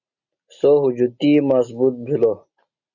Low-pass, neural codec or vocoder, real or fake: 7.2 kHz; none; real